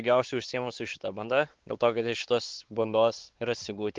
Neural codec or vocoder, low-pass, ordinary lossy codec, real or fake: codec, 16 kHz, 4 kbps, X-Codec, WavLM features, trained on Multilingual LibriSpeech; 7.2 kHz; Opus, 16 kbps; fake